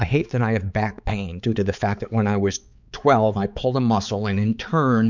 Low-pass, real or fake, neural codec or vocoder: 7.2 kHz; fake; codec, 16 kHz, 4 kbps, X-Codec, HuBERT features, trained on balanced general audio